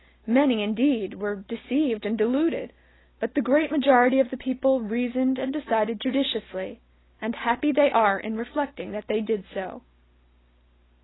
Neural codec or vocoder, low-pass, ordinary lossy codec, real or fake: none; 7.2 kHz; AAC, 16 kbps; real